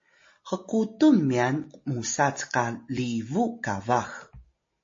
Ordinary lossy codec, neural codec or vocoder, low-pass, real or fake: MP3, 32 kbps; none; 7.2 kHz; real